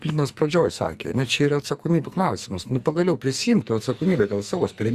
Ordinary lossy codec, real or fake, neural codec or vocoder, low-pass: Opus, 64 kbps; fake; codec, 44.1 kHz, 2.6 kbps, SNAC; 14.4 kHz